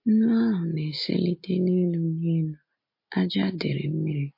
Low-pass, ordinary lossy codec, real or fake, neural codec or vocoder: 5.4 kHz; MP3, 32 kbps; real; none